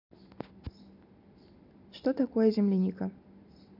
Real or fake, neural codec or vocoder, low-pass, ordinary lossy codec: real; none; 5.4 kHz; none